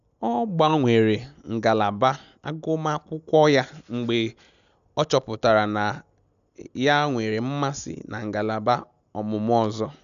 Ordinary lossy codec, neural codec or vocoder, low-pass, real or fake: none; none; 7.2 kHz; real